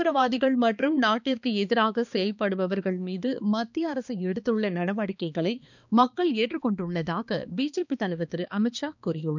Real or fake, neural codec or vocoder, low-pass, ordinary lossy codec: fake; codec, 16 kHz, 2 kbps, X-Codec, HuBERT features, trained on balanced general audio; 7.2 kHz; none